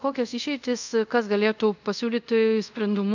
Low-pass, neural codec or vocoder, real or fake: 7.2 kHz; codec, 24 kHz, 0.5 kbps, DualCodec; fake